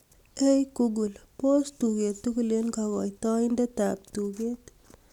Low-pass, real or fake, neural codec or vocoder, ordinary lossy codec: 19.8 kHz; real; none; none